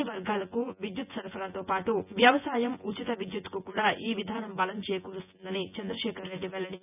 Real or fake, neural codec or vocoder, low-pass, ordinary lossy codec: fake; vocoder, 24 kHz, 100 mel bands, Vocos; 3.6 kHz; none